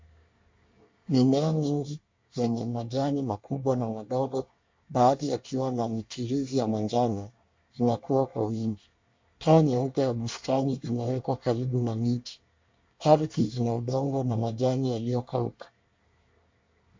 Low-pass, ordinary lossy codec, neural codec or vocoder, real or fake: 7.2 kHz; MP3, 48 kbps; codec, 24 kHz, 1 kbps, SNAC; fake